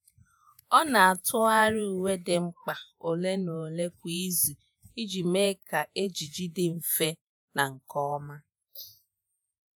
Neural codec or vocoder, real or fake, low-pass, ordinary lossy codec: vocoder, 48 kHz, 128 mel bands, Vocos; fake; none; none